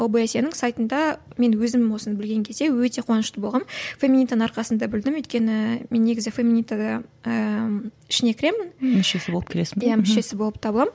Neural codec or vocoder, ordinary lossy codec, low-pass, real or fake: none; none; none; real